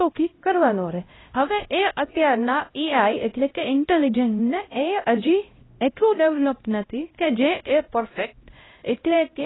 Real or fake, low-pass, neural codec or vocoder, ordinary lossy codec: fake; 7.2 kHz; codec, 16 kHz, 0.5 kbps, X-Codec, HuBERT features, trained on LibriSpeech; AAC, 16 kbps